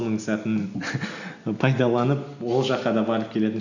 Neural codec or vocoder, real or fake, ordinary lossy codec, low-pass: none; real; none; 7.2 kHz